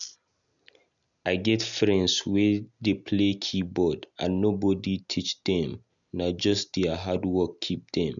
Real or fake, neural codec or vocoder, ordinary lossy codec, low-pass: real; none; none; 7.2 kHz